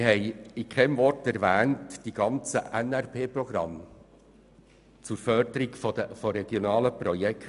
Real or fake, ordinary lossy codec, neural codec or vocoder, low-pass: real; none; none; 10.8 kHz